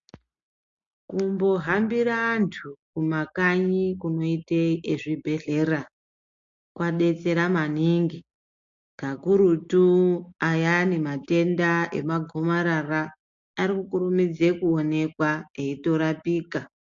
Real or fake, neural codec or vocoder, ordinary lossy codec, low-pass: real; none; MP3, 48 kbps; 7.2 kHz